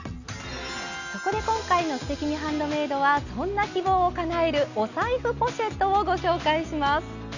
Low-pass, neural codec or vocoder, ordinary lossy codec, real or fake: 7.2 kHz; none; none; real